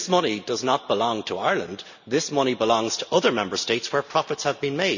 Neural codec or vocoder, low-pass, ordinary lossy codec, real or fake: none; 7.2 kHz; none; real